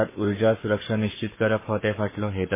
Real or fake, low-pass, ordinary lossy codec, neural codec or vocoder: fake; 3.6 kHz; MP3, 16 kbps; codec, 44.1 kHz, 7.8 kbps, Pupu-Codec